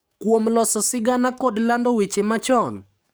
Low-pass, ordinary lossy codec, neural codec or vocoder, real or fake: none; none; codec, 44.1 kHz, 7.8 kbps, DAC; fake